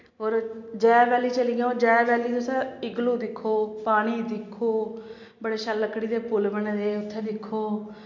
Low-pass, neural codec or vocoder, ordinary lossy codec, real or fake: 7.2 kHz; none; MP3, 48 kbps; real